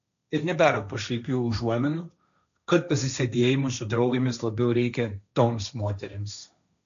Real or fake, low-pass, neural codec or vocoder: fake; 7.2 kHz; codec, 16 kHz, 1.1 kbps, Voila-Tokenizer